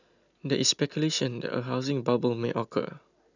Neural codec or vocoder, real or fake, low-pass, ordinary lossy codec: none; real; 7.2 kHz; none